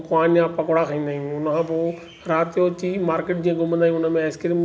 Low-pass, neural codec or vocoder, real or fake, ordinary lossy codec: none; none; real; none